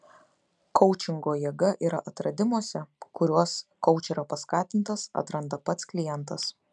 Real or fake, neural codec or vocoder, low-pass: real; none; 10.8 kHz